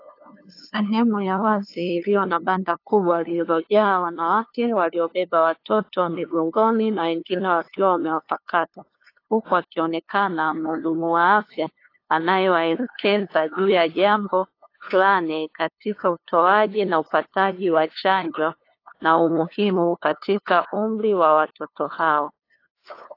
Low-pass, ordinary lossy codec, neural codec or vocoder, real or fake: 5.4 kHz; AAC, 32 kbps; codec, 16 kHz, 2 kbps, FunCodec, trained on LibriTTS, 25 frames a second; fake